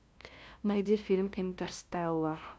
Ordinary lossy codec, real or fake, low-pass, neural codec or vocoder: none; fake; none; codec, 16 kHz, 0.5 kbps, FunCodec, trained on LibriTTS, 25 frames a second